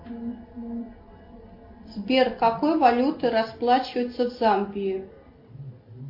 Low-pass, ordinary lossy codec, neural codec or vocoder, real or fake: 5.4 kHz; MP3, 32 kbps; none; real